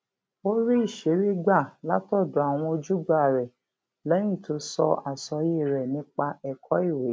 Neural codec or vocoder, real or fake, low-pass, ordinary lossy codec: none; real; none; none